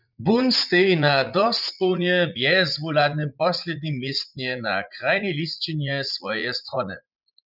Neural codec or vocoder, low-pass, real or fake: vocoder, 44.1 kHz, 80 mel bands, Vocos; 5.4 kHz; fake